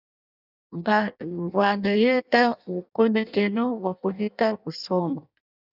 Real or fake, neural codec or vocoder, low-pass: fake; codec, 16 kHz in and 24 kHz out, 0.6 kbps, FireRedTTS-2 codec; 5.4 kHz